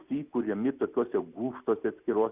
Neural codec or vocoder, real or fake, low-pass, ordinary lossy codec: none; real; 3.6 kHz; Opus, 64 kbps